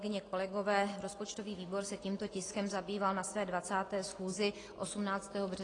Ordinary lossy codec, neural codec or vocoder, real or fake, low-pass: AAC, 32 kbps; none; real; 10.8 kHz